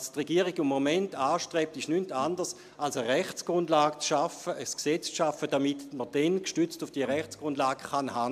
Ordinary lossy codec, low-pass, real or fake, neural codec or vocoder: AAC, 96 kbps; 14.4 kHz; real; none